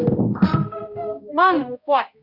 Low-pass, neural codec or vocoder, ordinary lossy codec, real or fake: 5.4 kHz; codec, 16 kHz, 0.5 kbps, X-Codec, HuBERT features, trained on balanced general audio; Opus, 64 kbps; fake